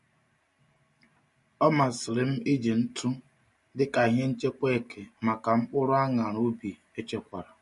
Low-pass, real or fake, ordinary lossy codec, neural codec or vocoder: 14.4 kHz; real; MP3, 48 kbps; none